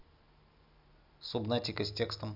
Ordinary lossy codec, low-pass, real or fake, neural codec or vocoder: none; 5.4 kHz; real; none